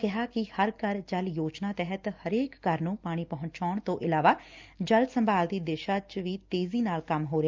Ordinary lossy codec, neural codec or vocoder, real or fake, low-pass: Opus, 24 kbps; none; real; 7.2 kHz